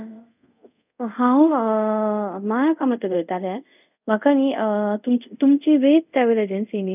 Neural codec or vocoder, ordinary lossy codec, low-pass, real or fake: codec, 24 kHz, 0.5 kbps, DualCodec; none; 3.6 kHz; fake